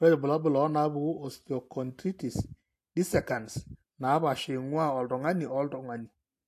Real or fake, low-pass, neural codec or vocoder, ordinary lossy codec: real; 14.4 kHz; none; AAC, 64 kbps